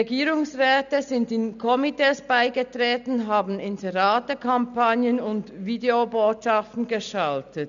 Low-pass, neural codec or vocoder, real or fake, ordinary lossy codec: 7.2 kHz; none; real; none